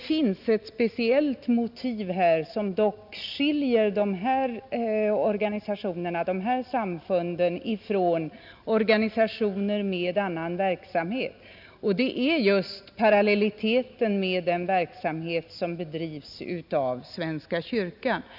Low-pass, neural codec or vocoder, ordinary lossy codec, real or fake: 5.4 kHz; none; none; real